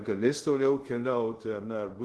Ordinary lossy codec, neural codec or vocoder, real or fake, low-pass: Opus, 16 kbps; codec, 24 kHz, 0.5 kbps, DualCodec; fake; 10.8 kHz